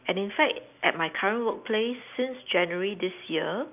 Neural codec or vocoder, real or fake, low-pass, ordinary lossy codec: none; real; 3.6 kHz; none